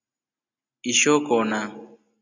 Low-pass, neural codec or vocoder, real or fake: 7.2 kHz; none; real